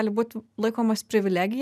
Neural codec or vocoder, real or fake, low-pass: none; real; 14.4 kHz